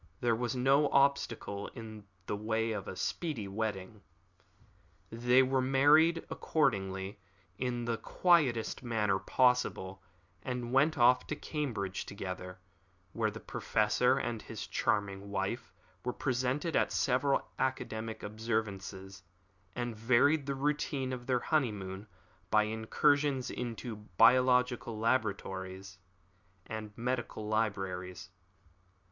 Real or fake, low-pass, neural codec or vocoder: real; 7.2 kHz; none